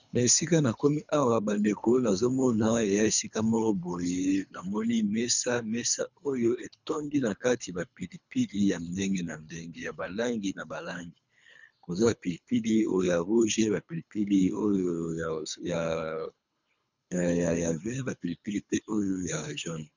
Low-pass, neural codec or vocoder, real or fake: 7.2 kHz; codec, 24 kHz, 3 kbps, HILCodec; fake